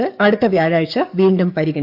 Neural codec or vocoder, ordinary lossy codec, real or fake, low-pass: codec, 44.1 kHz, 7.8 kbps, DAC; AAC, 48 kbps; fake; 5.4 kHz